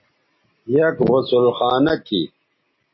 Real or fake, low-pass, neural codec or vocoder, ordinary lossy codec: real; 7.2 kHz; none; MP3, 24 kbps